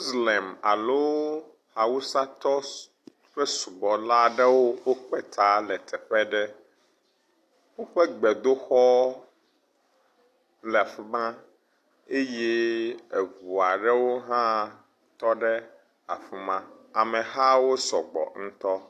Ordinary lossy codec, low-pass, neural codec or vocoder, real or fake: AAC, 64 kbps; 14.4 kHz; none; real